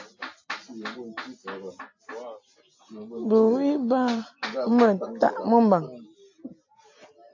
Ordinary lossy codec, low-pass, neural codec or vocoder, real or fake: AAC, 48 kbps; 7.2 kHz; none; real